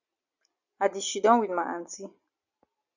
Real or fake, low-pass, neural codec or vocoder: real; 7.2 kHz; none